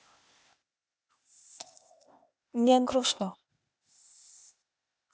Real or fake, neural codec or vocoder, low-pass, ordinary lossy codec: fake; codec, 16 kHz, 0.8 kbps, ZipCodec; none; none